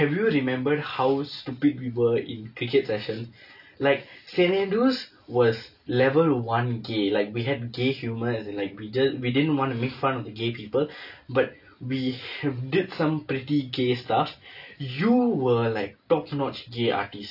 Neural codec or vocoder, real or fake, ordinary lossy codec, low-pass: none; real; none; 5.4 kHz